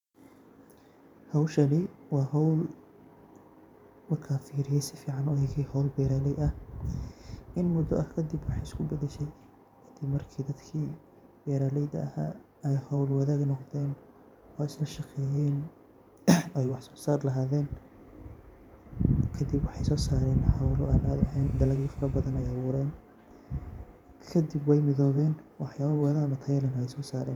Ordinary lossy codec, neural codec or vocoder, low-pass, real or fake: none; vocoder, 44.1 kHz, 128 mel bands every 512 samples, BigVGAN v2; 19.8 kHz; fake